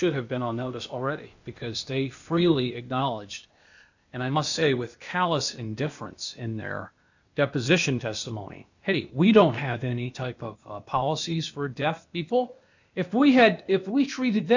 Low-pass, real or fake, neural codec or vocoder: 7.2 kHz; fake; codec, 16 kHz, 0.8 kbps, ZipCodec